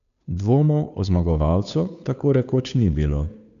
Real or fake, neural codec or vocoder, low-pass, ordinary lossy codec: fake; codec, 16 kHz, 2 kbps, FunCodec, trained on Chinese and English, 25 frames a second; 7.2 kHz; none